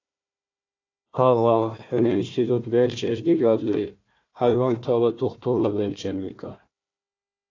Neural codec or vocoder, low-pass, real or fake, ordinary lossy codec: codec, 16 kHz, 1 kbps, FunCodec, trained on Chinese and English, 50 frames a second; 7.2 kHz; fake; AAC, 48 kbps